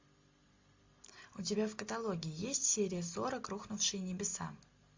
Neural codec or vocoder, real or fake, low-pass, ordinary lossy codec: none; real; 7.2 kHz; MP3, 48 kbps